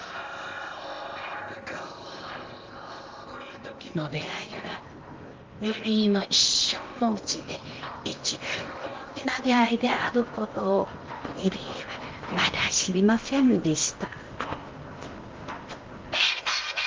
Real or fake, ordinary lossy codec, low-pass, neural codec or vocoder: fake; Opus, 32 kbps; 7.2 kHz; codec, 16 kHz in and 24 kHz out, 0.8 kbps, FocalCodec, streaming, 65536 codes